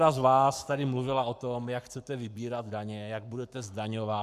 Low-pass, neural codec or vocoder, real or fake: 14.4 kHz; codec, 44.1 kHz, 7.8 kbps, Pupu-Codec; fake